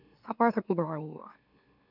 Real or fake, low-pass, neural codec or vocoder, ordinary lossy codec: fake; 5.4 kHz; autoencoder, 44.1 kHz, a latent of 192 numbers a frame, MeloTTS; none